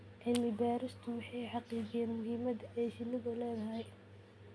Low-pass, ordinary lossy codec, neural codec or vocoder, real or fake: none; none; none; real